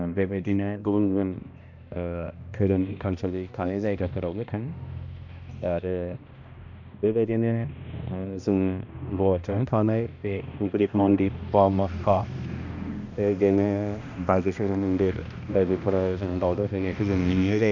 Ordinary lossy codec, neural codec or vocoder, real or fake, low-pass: none; codec, 16 kHz, 1 kbps, X-Codec, HuBERT features, trained on balanced general audio; fake; 7.2 kHz